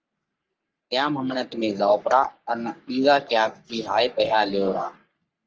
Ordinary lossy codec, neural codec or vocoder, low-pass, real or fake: Opus, 24 kbps; codec, 44.1 kHz, 3.4 kbps, Pupu-Codec; 7.2 kHz; fake